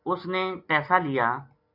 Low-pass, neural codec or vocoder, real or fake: 5.4 kHz; none; real